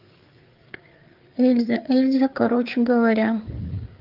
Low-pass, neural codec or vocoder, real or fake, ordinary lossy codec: 5.4 kHz; codec, 16 kHz, 4 kbps, FreqCodec, larger model; fake; Opus, 32 kbps